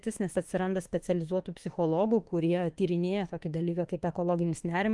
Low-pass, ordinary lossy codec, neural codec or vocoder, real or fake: 10.8 kHz; Opus, 24 kbps; autoencoder, 48 kHz, 32 numbers a frame, DAC-VAE, trained on Japanese speech; fake